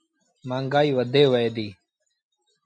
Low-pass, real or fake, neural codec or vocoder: 9.9 kHz; real; none